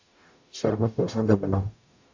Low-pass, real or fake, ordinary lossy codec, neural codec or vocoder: 7.2 kHz; fake; AAC, 48 kbps; codec, 44.1 kHz, 0.9 kbps, DAC